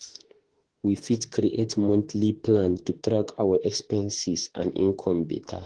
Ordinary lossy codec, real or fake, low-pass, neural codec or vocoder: Opus, 16 kbps; fake; 9.9 kHz; autoencoder, 48 kHz, 32 numbers a frame, DAC-VAE, trained on Japanese speech